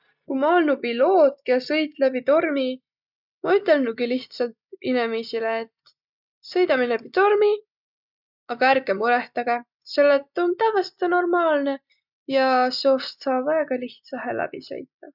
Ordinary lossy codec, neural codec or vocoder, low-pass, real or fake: none; none; 5.4 kHz; real